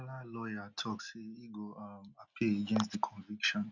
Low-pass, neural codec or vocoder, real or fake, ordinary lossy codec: 7.2 kHz; none; real; none